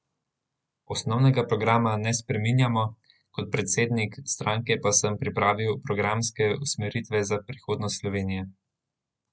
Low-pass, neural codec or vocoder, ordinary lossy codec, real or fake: none; none; none; real